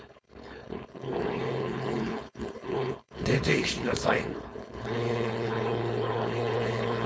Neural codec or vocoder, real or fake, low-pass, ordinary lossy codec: codec, 16 kHz, 4.8 kbps, FACodec; fake; none; none